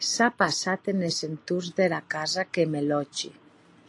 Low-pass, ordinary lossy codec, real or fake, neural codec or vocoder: 10.8 kHz; AAC, 48 kbps; real; none